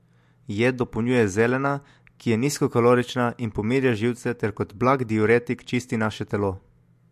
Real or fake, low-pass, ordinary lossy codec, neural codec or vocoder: real; 14.4 kHz; MP3, 64 kbps; none